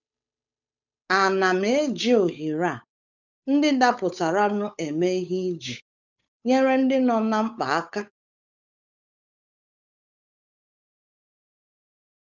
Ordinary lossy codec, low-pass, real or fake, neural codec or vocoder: none; 7.2 kHz; fake; codec, 16 kHz, 8 kbps, FunCodec, trained on Chinese and English, 25 frames a second